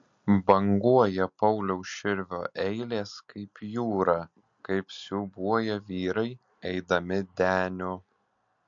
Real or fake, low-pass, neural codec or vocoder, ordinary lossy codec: real; 7.2 kHz; none; MP3, 48 kbps